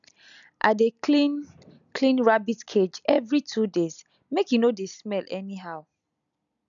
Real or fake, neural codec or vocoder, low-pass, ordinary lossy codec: real; none; 7.2 kHz; none